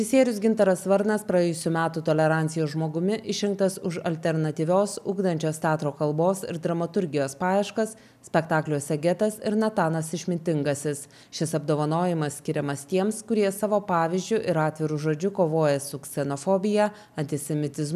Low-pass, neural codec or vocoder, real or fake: 14.4 kHz; none; real